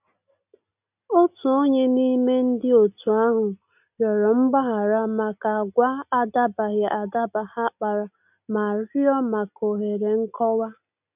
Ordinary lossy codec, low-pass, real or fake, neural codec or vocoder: none; 3.6 kHz; real; none